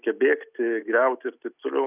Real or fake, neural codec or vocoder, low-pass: real; none; 3.6 kHz